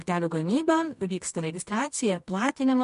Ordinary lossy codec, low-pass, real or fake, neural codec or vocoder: MP3, 64 kbps; 10.8 kHz; fake; codec, 24 kHz, 0.9 kbps, WavTokenizer, medium music audio release